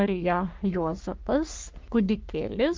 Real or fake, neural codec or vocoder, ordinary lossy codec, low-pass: fake; codec, 44.1 kHz, 3.4 kbps, Pupu-Codec; Opus, 24 kbps; 7.2 kHz